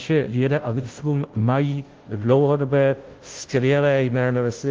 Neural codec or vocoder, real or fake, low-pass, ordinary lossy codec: codec, 16 kHz, 0.5 kbps, FunCodec, trained on Chinese and English, 25 frames a second; fake; 7.2 kHz; Opus, 16 kbps